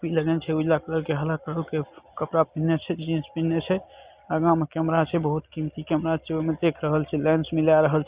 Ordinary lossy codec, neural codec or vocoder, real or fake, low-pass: Opus, 64 kbps; none; real; 3.6 kHz